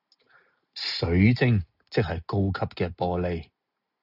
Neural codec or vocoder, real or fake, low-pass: none; real; 5.4 kHz